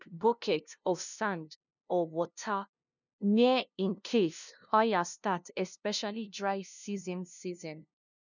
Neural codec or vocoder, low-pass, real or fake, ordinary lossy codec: codec, 16 kHz, 0.5 kbps, FunCodec, trained on LibriTTS, 25 frames a second; 7.2 kHz; fake; none